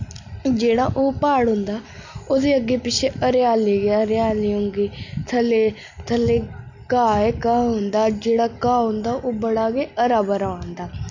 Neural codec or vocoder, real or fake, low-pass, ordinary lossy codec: none; real; 7.2 kHz; none